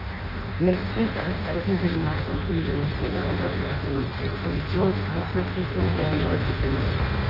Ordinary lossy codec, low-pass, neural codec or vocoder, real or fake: none; 5.4 kHz; codec, 16 kHz in and 24 kHz out, 0.6 kbps, FireRedTTS-2 codec; fake